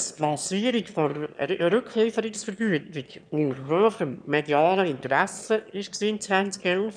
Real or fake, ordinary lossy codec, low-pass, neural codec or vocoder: fake; none; 9.9 kHz; autoencoder, 22.05 kHz, a latent of 192 numbers a frame, VITS, trained on one speaker